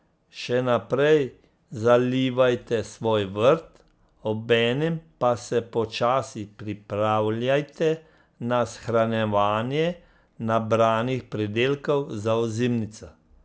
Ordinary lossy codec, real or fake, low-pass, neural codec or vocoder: none; real; none; none